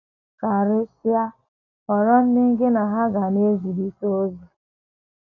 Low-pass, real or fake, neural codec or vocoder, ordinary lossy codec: 7.2 kHz; real; none; none